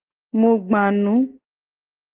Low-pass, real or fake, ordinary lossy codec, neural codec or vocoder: 3.6 kHz; real; Opus, 16 kbps; none